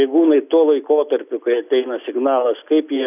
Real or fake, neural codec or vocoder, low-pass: real; none; 3.6 kHz